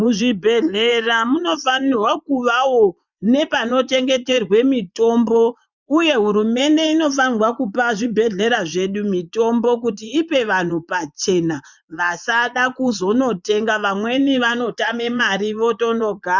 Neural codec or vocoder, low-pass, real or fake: vocoder, 24 kHz, 100 mel bands, Vocos; 7.2 kHz; fake